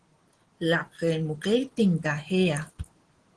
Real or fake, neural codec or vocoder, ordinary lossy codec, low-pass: real; none; Opus, 16 kbps; 9.9 kHz